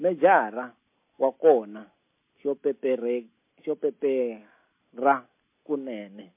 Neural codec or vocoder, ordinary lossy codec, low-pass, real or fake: none; MP3, 24 kbps; 3.6 kHz; real